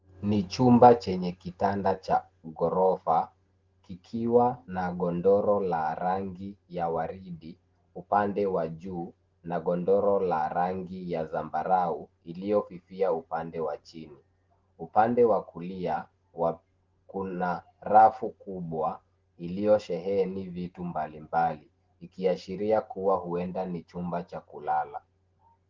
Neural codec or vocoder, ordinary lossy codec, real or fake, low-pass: none; Opus, 16 kbps; real; 7.2 kHz